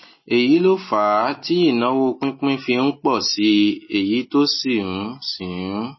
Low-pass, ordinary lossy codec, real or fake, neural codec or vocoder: 7.2 kHz; MP3, 24 kbps; real; none